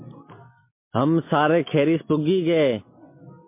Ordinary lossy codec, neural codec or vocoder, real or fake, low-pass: MP3, 24 kbps; none; real; 3.6 kHz